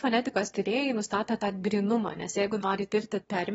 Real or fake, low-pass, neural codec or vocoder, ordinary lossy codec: fake; 9.9 kHz; autoencoder, 22.05 kHz, a latent of 192 numbers a frame, VITS, trained on one speaker; AAC, 24 kbps